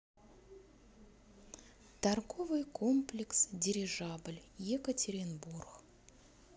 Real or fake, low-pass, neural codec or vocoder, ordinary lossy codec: real; none; none; none